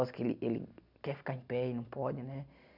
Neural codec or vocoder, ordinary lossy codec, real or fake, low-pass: none; none; real; 5.4 kHz